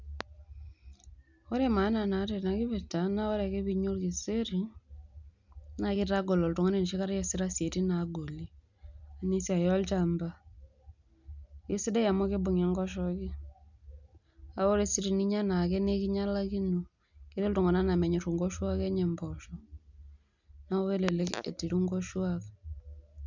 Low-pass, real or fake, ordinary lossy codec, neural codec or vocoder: 7.2 kHz; real; none; none